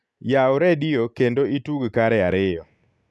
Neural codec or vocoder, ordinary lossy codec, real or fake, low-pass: none; none; real; none